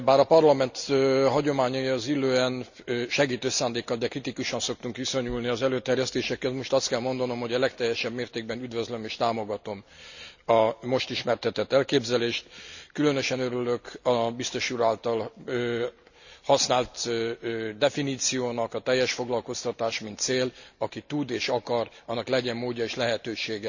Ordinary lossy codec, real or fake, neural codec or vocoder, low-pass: none; real; none; 7.2 kHz